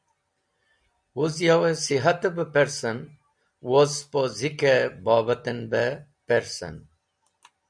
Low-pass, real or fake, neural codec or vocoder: 9.9 kHz; real; none